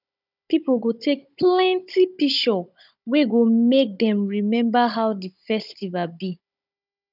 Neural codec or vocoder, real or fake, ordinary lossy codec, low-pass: codec, 16 kHz, 16 kbps, FunCodec, trained on Chinese and English, 50 frames a second; fake; none; 5.4 kHz